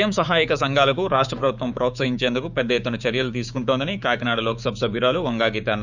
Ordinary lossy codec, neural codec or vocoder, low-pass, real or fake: none; codec, 16 kHz, 6 kbps, DAC; 7.2 kHz; fake